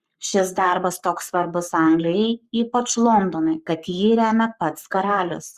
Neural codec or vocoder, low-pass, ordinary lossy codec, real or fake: codec, 44.1 kHz, 7.8 kbps, Pupu-Codec; 14.4 kHz; Opus, 64 kbps; fake